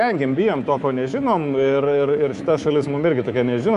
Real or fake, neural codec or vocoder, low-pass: fake; codec, 24 kHz, 3.1 kbps, DualCodec; 10.8 kHz